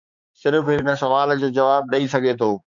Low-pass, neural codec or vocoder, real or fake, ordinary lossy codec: 7.2 kHz; codec, 16 kHz, 4 kbps, X-Codec, HuBERT features, trained on balanced general audio; fake; MP3, 64 kbps